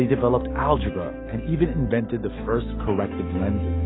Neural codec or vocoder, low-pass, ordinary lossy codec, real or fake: autoencoder, 48 kHz, 128 numbers a frame, DAC-VAE, trained on Japanese speech; 7.2 kHz; AAC, 16 kbps; fake